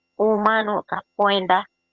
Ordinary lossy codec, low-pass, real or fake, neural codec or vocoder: Opus, 32 kbps; 7.2 kHz; fake; vocoder, 22.05 kHz, 80 mel bands, HiFi-GAN